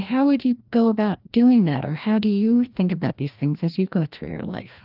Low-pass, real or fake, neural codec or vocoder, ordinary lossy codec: 5.4 kHz; fake; codec, 16 kHz, 1 kbps, FreqCodec, larger model; Opus, 24 kbps